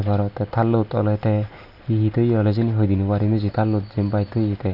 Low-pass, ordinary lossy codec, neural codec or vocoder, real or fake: 5.4 kHz; none; none; real